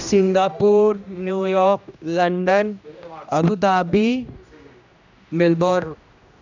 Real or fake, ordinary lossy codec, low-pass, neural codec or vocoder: fake; none; 7.2 kHz; codec, 16 kHz, 1 kbps, X-Codec, HuBERT features, trained on general audio